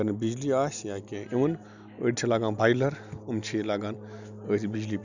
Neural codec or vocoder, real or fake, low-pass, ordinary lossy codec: none; real; 7.2 kHz; none